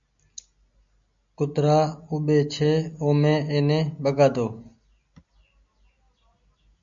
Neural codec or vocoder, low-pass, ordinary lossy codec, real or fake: none; 7.2 kHz; MP3, 64 kbps; real